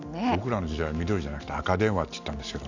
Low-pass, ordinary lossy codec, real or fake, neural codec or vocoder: 7.2 kHz; none; real; none